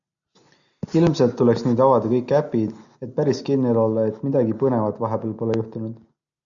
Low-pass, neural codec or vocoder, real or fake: 7.2 kHz; none; real